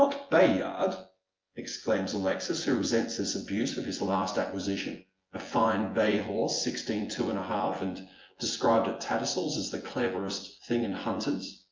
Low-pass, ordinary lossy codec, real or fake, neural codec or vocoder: 7.2 kHz; Opus, 32 kbps; fake; vocoder, 24 kHz, 100 mel bands, Vocos